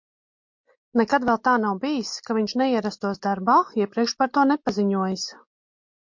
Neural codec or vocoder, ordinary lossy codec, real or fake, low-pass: none; MP3, 48 kbps; real; 7.2 kHz